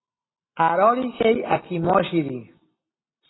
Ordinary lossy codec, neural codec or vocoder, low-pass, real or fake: AAC, 16 kbps; none; 7.2 kHz; real